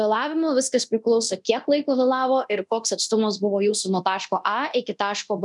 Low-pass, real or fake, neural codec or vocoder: 10.8 kHz; fake; codec, 24 kHz, 0.9 kbps, DualCodec